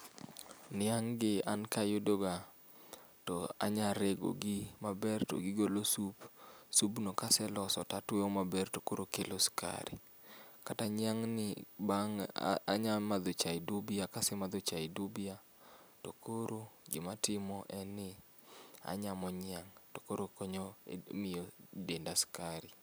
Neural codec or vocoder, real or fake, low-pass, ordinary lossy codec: none; real; none; none